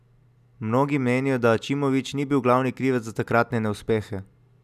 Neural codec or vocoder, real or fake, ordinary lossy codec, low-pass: none; real; none; 14.4 kHz